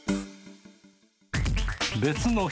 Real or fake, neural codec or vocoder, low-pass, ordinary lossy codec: real; none; none; none